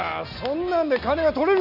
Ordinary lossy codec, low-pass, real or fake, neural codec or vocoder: none; 5.4 kHz; real; none